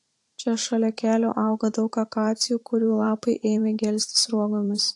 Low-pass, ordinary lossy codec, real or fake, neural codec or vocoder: 10.8 kHz; AAC, 48 kbps; fake; vocoder, 44.1 kHz, 128 mel bands every 512 samples, BigVGAN v2